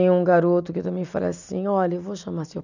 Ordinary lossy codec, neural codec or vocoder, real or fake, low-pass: none; none; real; 7.2 kHz